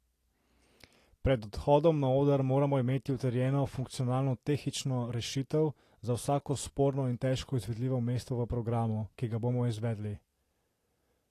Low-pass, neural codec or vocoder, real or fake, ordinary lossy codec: 14.4 kHz; none; real; AAC, 48 kbps